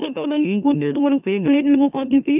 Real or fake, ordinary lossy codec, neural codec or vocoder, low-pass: fake; none; autoencoder, 44.1 kHz, a latent of 192 numbers a frame, MeloTTS; 3.6 kHz